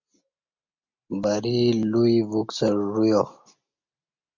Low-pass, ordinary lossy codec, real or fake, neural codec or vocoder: 7.2 kHz; MP3, 64 kbps; real; none